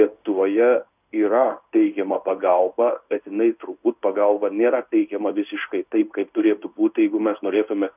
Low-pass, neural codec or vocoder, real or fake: 3.6 kHz; codec, 16 kHz in and 24 kHz out, 1 kbps, XY-Tokenizer; fake